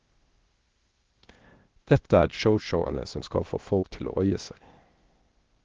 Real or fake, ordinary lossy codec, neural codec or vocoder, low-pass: fake; Opus, 16 kbps; codec, 16 kHz, 0.8 kbps, ZipCodec; 7.2 kHz